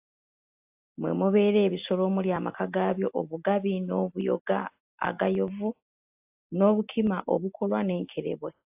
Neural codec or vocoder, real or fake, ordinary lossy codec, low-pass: none; real; AAC, 32 kbps; 3.6 kHz